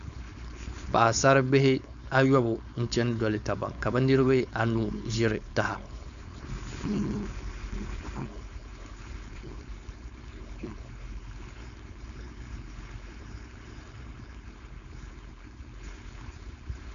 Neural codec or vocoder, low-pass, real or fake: codec, 16 kHz, 4.8 kbps, FACodec; 7.2 kHz; fake